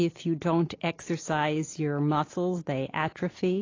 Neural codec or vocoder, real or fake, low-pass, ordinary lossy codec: none; real; 7.2 kHz; AAC, 32 kbps